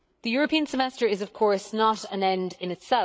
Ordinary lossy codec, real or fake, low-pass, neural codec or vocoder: none; fake; none; codec, 16 kHz, 8 kbps, FreqCodec, larger model